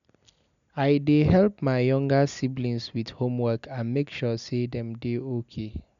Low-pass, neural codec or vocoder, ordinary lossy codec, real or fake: 7.2 kHz; none; none; real